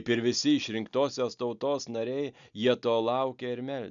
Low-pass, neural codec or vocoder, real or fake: 7.2 kHz; none; real